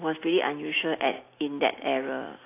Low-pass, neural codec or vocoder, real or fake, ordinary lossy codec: 3.6 kHz; none; real; AAC, 24 kbps